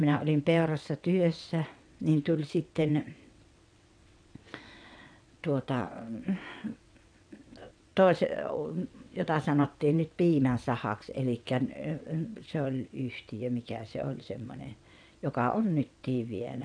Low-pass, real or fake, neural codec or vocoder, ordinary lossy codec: 9.9 kHz; fake; vocoder, 22.05 kHz, 80 mel bands, WaveNeXt; none